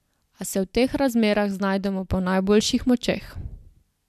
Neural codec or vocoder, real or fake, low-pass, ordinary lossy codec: none; real; 14.4 kHz; MP3, 96 kbps